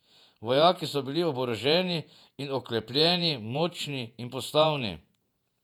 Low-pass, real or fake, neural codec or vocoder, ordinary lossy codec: 19.8 kHz; fake; vocoder, 48 kHz, 128 mel bands, Vocos; none